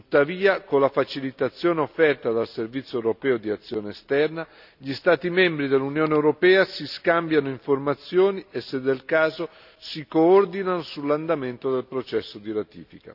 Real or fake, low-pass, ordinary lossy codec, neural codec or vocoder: real; 5.4 kHz; none; none